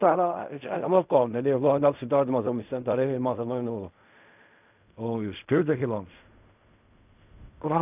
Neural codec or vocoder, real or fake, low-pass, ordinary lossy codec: codec, 16 kHz in and 24 kHz out, 0.4 kbps, LongCat-Audio-Codec, fine tuned four codebook decoder; fake; 3.6 kHz; none